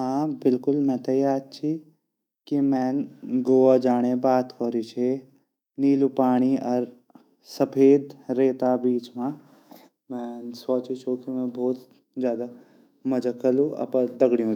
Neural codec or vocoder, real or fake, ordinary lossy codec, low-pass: autoencoder, 48 kHz, 128 numbers a frame, DAC-VAE, trained on Japanese speech; fake; none; 19.8 kHz